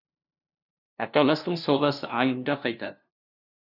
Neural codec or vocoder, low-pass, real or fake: codec, 16 kHz, 0.5 kbps, FunCodec, trained on LibriTTS, 25 frames a second; 5.4 kHz; fake